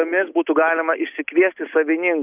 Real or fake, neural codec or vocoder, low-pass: real; none; 3.6 kHz